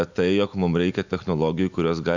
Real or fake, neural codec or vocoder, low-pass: real; none; 7.2 kHz